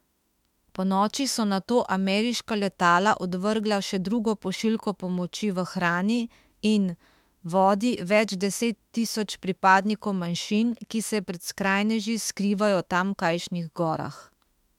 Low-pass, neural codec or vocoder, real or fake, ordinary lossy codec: 19.8 kHz; autoencoder, 48 kHz, 32 numbers a frame, DAC-VAE, trained on Japanese speech; fake; MP3, 96 kbps